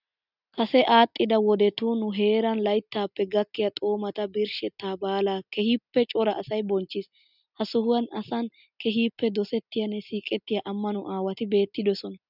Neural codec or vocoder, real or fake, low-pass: none; real; 5.4 kHz